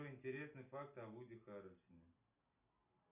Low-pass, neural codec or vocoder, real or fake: 3.6 kHz; none; real